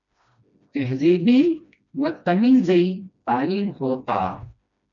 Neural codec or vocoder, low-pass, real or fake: codec, 16 kHz, 1 kbps, FreqCodec, smaller model; 7.2 kHz; fake